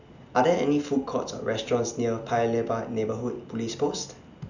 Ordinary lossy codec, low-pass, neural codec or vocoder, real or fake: none; 7.2 kHz; none; real